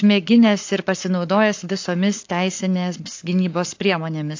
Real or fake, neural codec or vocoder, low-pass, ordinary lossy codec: fake; codec, 44.1 kHz, 7.8 kbps, Pupu-Codec; 7.2 kHz; AAC, 48 kbps